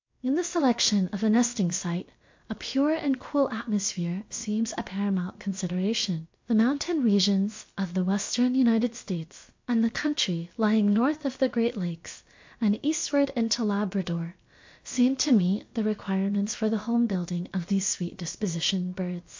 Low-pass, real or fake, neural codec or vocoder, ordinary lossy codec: 7.2 kHz; fake; codec, 16 kHz, about 1 kbps, DyCAST, with the encoder's durations; MP3, 48 kbps